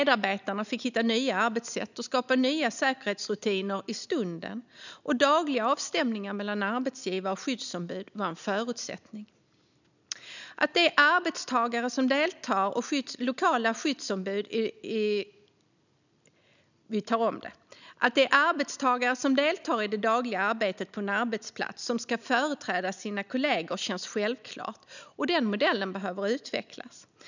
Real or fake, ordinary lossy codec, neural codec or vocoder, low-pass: real; none; none; 7.2 kHz